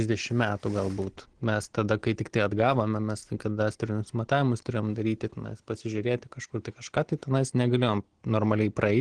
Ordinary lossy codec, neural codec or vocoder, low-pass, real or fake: Opus, 16 kbps; none; 9.9 kHz; real